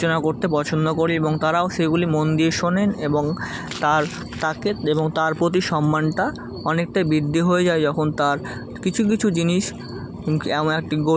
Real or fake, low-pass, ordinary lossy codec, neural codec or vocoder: real; none; none; none